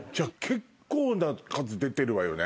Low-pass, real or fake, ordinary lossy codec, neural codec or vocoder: none; real; none; none